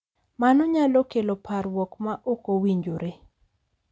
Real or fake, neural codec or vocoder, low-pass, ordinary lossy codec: real; none; none; none